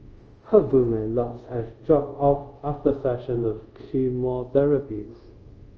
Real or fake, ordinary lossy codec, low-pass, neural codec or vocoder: fake; Opus, 24 kbps; 7.2 kHz; codec, 24 kHz, 0.5 kbps, DualCodec